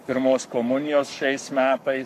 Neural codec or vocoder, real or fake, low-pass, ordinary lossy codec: codec, 44.1 kHz, 7.8 kbps, Pupu-Codec; fake; 14.4 kHz; MP3, 96 kbps